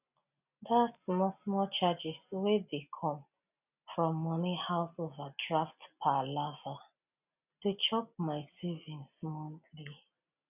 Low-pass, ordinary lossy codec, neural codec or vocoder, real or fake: 3.6 kHz; none; none; real